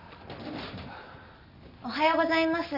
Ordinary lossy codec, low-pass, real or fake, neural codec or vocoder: none; 5.4 kHz; real; none